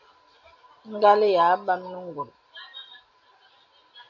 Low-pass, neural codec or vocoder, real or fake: 7.2 kHz; none; real